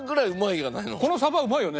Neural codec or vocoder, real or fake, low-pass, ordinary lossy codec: none; real; none; none